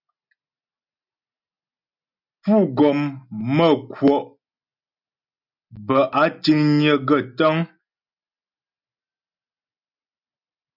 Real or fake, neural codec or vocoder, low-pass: real; none; 5.4 kHz